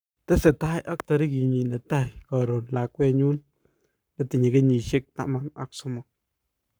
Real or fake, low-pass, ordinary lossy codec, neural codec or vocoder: fake; none; none; codec, 44.1 kHz, 7.8 kbps, Pupu-Codec